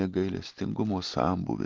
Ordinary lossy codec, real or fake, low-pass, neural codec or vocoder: Opus, 32 kbps; real; 7.2 kHz; none